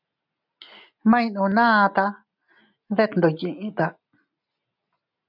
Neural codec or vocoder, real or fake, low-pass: none; real; 5.4 kHz